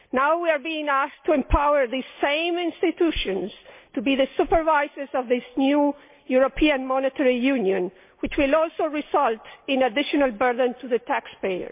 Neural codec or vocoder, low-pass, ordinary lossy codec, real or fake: none; 3.6 kHz; MP3, 32 kbps; real